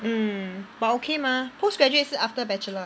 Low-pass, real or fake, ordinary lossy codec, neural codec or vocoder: none; real; none; none